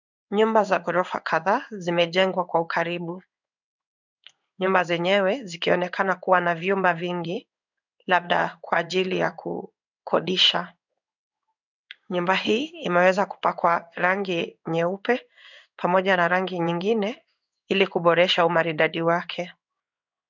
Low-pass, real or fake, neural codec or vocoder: 7.2 kHz; fake; codec, 16 kHz in and 24 kHz out, 1 kbps, XY-Tokenizer